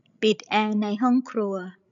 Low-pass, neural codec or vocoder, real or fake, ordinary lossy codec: 7.2 kHz; codec, 16 kHz, 16 kbps, FreqCodec, larger model; fake; none